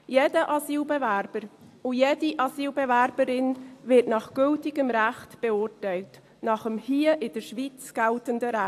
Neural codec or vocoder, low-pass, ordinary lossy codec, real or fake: none; 14.4 kHz; AAC, 64 kbps; real